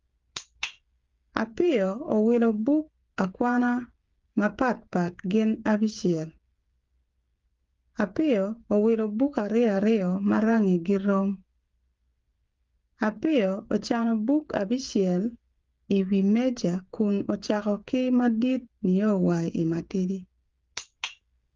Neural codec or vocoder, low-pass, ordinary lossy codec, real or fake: codec, 16 kHz, 8 kbps, FreqCodec, smaller model; 7.2 kHz; Opus, 32 kbps; fake